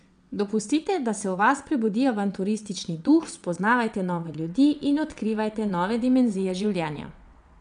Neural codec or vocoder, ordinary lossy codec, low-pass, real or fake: vocoder, 22.05 kHz, 80 mel bands, WaveNeXt; none; 9.9 kHz; fake